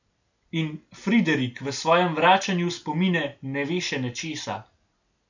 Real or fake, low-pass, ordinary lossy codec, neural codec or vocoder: real; 7.2 kHz; none; none